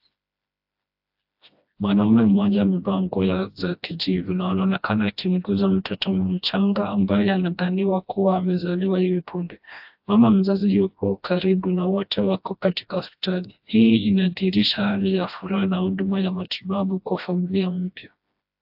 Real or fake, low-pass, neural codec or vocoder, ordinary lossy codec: fake; 5.4 kHz; codec, 16 kHz, 1 kbps, FreqCodec, smaller model; AAC, 48 kbps